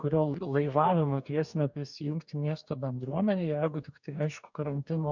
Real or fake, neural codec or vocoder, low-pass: fake; codec, 44.1 kHz, 2.6 kbps, DAC; 7.2 kHz